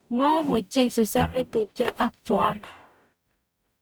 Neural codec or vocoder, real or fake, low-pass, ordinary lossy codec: codec, 44.1 kHz, 0.9 kbps, DAC; fake; none; none